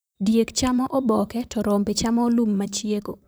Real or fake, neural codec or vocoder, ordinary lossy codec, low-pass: fake; vocoder, 44.1 kHz, 128 mel bands, Pupu-Vocoder; none; none